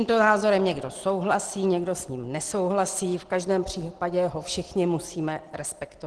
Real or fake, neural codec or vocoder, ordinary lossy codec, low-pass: real; none; Opus, 16 kbps; 10.8 kHz